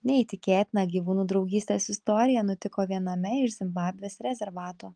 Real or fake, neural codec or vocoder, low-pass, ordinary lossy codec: real; none; 9.9 kHz; Opus, 24 kbps